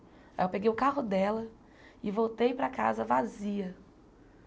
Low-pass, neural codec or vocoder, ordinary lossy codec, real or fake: none; none; none; real